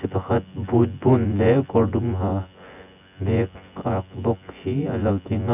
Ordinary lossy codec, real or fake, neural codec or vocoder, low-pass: none; fake; vocoder, 24 kHz, 100 mel bands, Vocos; 3.6 kHz